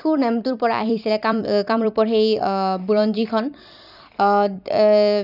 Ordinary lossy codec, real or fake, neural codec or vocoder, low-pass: none; fake; vocoder, 44.1 kHz, 128 mel bands every 256 samples, BigVGAN v2; 5.4 kHz